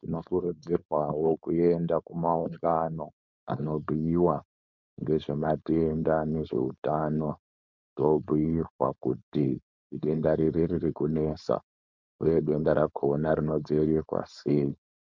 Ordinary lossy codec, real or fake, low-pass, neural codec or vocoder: MP3, 64 kbps; fake; 7.2 kHz; codec, 16 kHz, 4.8 kbps, FACodec